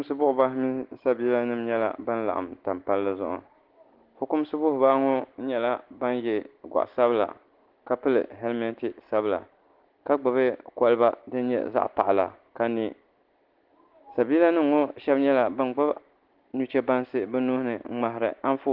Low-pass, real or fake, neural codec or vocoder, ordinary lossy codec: 5.4 kHz; real; none; Opus, 16 kbps